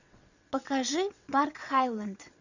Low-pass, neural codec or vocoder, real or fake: 7.2 kHz; vocoder, 22.05 kHz, 80 mel bands, WaveNeXt; fake